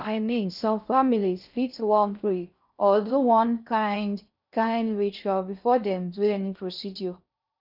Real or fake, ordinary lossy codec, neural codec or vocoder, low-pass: fake; none; codec, 16 kHz in and 24 kHz out, 0.6 kbps, FocalCodec, streaming, 2048 codes; 5.4 kHz